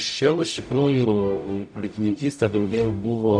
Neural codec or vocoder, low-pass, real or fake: codec, 44.1 kHz, 0.9 kbps, DAC; 9.9 kHz; fake